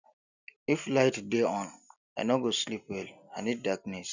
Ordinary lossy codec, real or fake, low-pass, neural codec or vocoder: none; real; 7.2 kHz; none